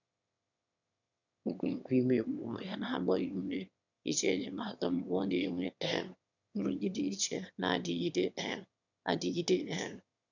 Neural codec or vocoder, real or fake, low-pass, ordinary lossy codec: autoencoder, 22.05 kHz, a latent of 192 numbers a frame, VITS, trained on one speaker; fake; 7.2 kHz; none